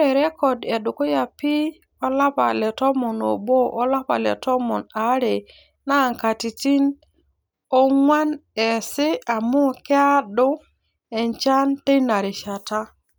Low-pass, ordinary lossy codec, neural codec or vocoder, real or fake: none; none; none; real